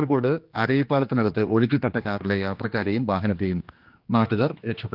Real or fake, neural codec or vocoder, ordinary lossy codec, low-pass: fake; codec, 16 kHz, 2 kbps, X-Codec, HuBERT features, trained on general audio; Opus, 24 kbps; 5.4 kHz